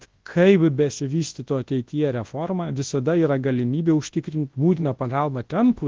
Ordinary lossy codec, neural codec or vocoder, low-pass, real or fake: Opus, 16 kbps; codec, 24 kHz, 0.9 kbps, WavTokenizer, large speech release; 7.2 kHz; fake